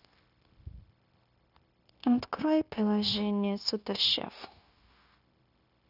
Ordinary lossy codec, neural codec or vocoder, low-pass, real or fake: none; codec, 16 kHz, 0.9 kbps, LongCat-Audio-Codec; 5.4 kHz; fake